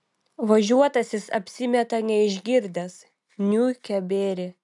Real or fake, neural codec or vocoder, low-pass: real; none; 10.8 kHz